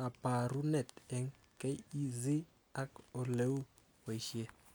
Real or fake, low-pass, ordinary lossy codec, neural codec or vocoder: real; none; none; none